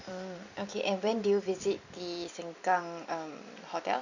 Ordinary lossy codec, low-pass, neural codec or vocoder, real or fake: none; 7.2 kHz; vocoder, 44.1 kHz, 128 mel bands every 256 samples, BigVGAN v2; fake